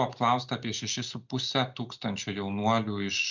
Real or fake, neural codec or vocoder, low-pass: real; none; 7.2 kHz